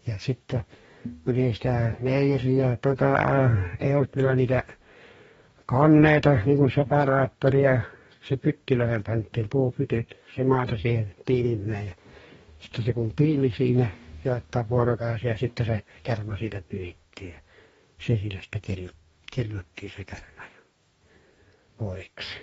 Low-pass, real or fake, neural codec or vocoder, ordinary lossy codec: 19.8 kHz; fake; codec, 44.1 kHz, 2.6 kbps, DAC; AAC, 24 kbps